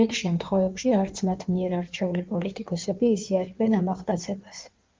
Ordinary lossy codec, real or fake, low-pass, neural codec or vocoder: Opus, 32 kbps; fake; 7.2 kHz; codec, 16 kHz in and 24 kHz out, 1.1 kbps, FireRedTTS-2 codec